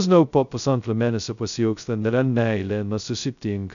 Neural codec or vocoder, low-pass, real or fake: codec, 16 kHz, 0.2 kbps, FocalCodec; 7.2 kHz; fake